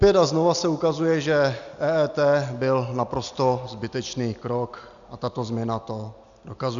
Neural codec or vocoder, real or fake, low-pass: none; real; 7.2 kHz